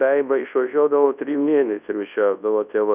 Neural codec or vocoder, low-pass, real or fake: codec, 24 kHz, 0.9 kbps, WavTokenizer, large speech release; 3.6 kHz; fake